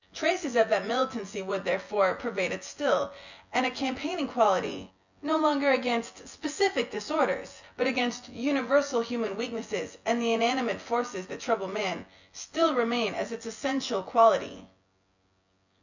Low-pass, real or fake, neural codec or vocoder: 7.2 kHz; fake; vocoder, 24 kHz, 100 mel bands, Vocos